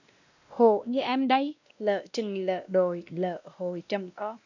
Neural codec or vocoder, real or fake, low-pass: codec, 16 kHz, 1 kbps, X-Codec, WavLM features, trained on Multilingual LibriSpeech; fake; 7.2 kHz